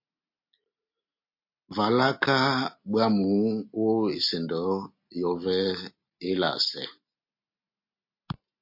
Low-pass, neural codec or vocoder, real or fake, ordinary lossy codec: 5.4 kHz; none; real; MP3, 32 kbps